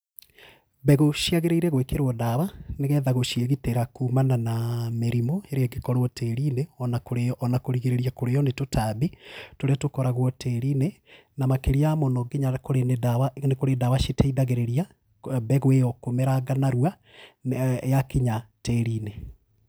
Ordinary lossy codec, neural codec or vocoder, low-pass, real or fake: none; none; none; real